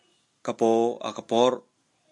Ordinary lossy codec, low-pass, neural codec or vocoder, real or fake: MP3, 64 kbps; 10.8 kHz; none; real